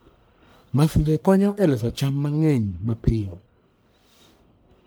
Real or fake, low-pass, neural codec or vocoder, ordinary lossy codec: fake; none; codec, 44.1 kHz, 1.7 kbps, Pupu-Codec; none